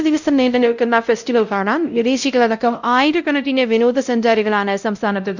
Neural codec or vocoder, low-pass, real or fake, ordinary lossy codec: codec, 16 kHz, 0.5 kbps, X-Codec, WavLM features, trained on Multilingual LibriSpeech; 7.2 kHz; fake; none